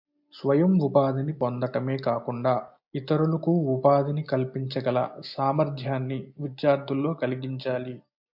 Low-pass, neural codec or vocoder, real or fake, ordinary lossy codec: 5.4 kHz; none; real; MP3, 48 kbps